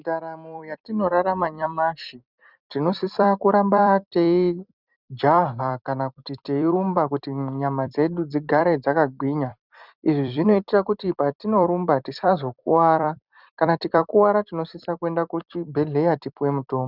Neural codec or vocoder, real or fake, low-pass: vocoder, 44.1 kHz, 128 mel bands every 256 samples, BigVGAN v2; fake; 5.4 kHz